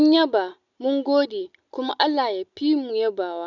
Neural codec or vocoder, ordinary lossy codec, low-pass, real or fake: none; none; 7.2 kHz; real